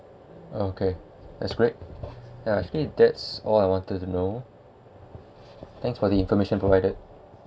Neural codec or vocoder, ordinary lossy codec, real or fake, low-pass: none; none; real; none